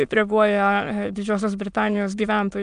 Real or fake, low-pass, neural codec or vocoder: fake; 9.9 kHz; autoencoder, 22.05 kHz, a latent of 192 numbers a frame, VITS, trained on many speakers